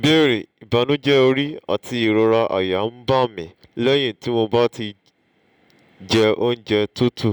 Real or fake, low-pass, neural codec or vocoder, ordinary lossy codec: real; 19.8 kHz; none; none